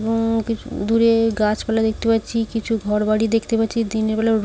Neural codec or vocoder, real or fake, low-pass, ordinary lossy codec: none; real; none; none